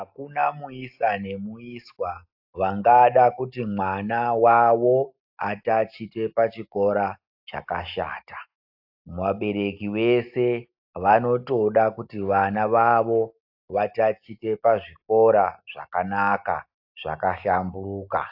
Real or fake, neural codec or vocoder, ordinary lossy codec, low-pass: real; none; AAC, 48 kbps; 5.4 kHz